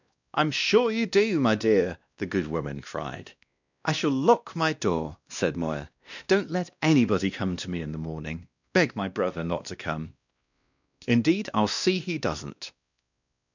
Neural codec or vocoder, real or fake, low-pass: codec, 16 kHz, 1 kbps, X-Codec, WavLM features, trained on Multilingual LibriSpeech; fake; 7.2 kHz